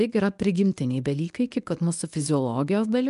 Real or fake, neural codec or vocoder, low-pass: fake; codec, 24 kHz, 0.9 kbps, WavTokenizer, medium speech release version 1; 10.8 kHz